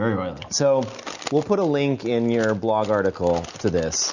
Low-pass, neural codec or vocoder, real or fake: 7.2 kHz; none; real